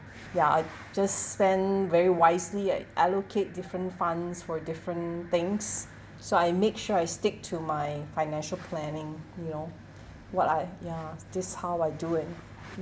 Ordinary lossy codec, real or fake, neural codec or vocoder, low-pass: none; real; none; none